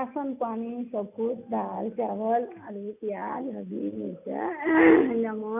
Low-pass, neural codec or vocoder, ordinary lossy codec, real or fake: 3.6 kHz; none; none; real